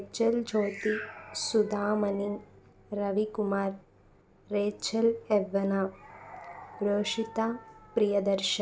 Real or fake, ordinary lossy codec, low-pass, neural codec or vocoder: real; none; none; none